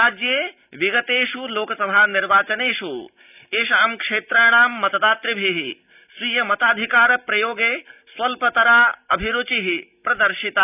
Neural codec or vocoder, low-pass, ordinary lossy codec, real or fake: none; 3.6 kHz; none; real